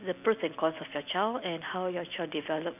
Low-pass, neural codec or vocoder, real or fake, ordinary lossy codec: 3.6 kHz; none; real; none